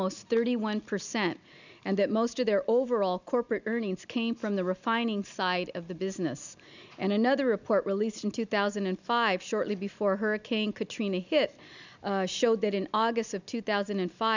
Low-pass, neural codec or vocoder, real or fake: 7.2 kHz; none; real